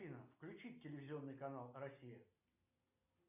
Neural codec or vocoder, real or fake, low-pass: none; real; 3.6 kHz